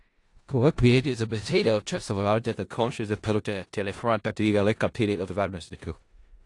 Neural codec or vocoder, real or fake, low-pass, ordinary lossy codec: codec, 16 kHz in and 24 kHz out, 0.4 kbps, LongCat-Audio-Codec, four codebook decoder; fake; 10.8 kHz; AAC, 48 kbps